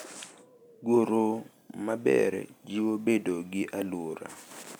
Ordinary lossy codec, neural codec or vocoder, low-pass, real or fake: none; none; none; real